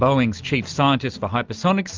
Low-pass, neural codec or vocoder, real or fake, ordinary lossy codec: 7.2 kHz; none; real; Opus, 24 kbps